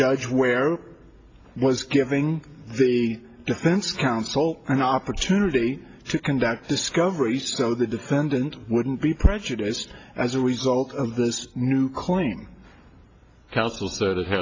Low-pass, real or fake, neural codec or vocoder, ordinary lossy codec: 7.2 kHz; real; none; AAC, 32 kbps